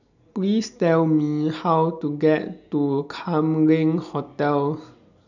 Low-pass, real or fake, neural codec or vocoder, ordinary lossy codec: 7.2 kHz; real; none; none